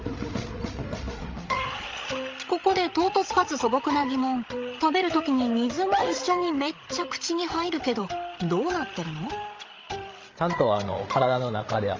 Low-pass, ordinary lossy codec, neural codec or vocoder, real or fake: 7.2 kHz; Opus, 32 kbps; codec, 16 kHz, 16 kbps, FreqCodec, larger model; fake